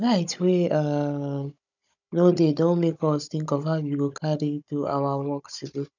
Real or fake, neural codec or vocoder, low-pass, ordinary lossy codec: fake; codec, 16 kHz, 16 kbps, FunCodec, trained on Chinese and English, 50 frames a second; 7.2 kHz; none